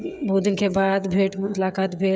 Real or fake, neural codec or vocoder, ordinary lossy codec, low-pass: fake; codec, 16 kHz, 16 kbps, FreqCodec, smaller model; none; none